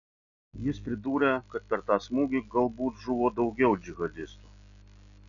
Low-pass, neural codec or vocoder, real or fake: 7.2 kHz; none; real